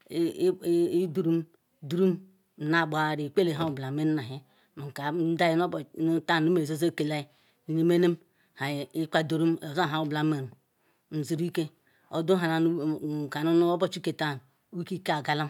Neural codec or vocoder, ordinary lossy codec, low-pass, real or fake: none; none; 19.8 kHz; real